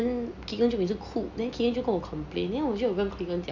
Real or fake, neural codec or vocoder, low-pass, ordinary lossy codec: real; none; 7.2 kHz; none